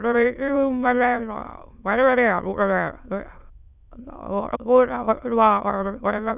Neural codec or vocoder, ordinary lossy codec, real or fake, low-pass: autoencoder, 22.05 kHz, a latent of 192 numbers a frame, VITS, trained on many speakers; none; fake; 3.6 kHz